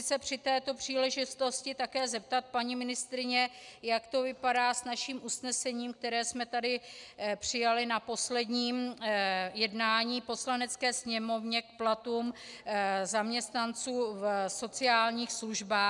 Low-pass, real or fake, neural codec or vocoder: 10.8 kHz; real; none